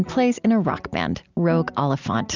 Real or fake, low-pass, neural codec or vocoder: real; 7.2 kHz; none